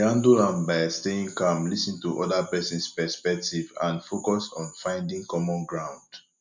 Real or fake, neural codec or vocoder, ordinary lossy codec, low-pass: real; none; MP3, 64 kbps; 7.2 kHz